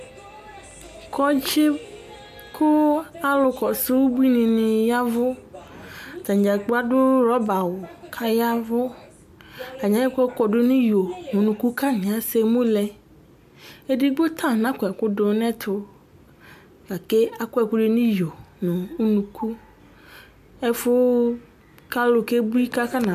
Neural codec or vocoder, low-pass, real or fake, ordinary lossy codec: none; 14.4 kHz; real; MP3, 96 kbps